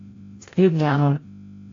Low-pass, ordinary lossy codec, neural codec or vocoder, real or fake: 7.2 kHz; AAC, 32 kbps; codec, 16 kHz, 0.5 kbps, FreqCodec, larger model; fake